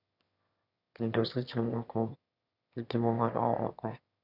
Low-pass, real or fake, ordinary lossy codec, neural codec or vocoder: 5.4 kHz; fake; none; autoencoder, 22.05 kHz, a latent of 192 numbers a frame, VITS, trained on one speaker